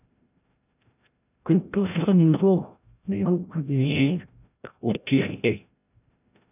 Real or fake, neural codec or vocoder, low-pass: fake; codec, 16 kHz, 0.5 kbps, FreqCodec, larger model; 3.6 kHz